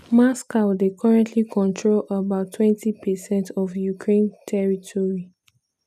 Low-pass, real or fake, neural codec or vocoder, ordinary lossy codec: 14.4 kHz; real; none; none